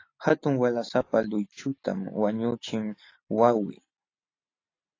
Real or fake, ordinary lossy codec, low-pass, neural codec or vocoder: real; AAC, 32 kbps; 7.2 kHz; none